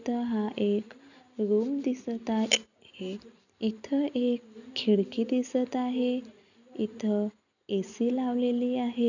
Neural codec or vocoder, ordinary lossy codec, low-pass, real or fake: none; none; 7.2 kHz; real